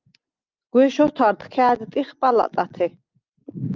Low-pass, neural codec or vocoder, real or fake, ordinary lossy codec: 7.2 kHz; none; real; Opus, 24 kbps